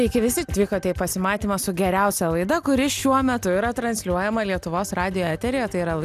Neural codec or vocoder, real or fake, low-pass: none; real; 14.4 kHz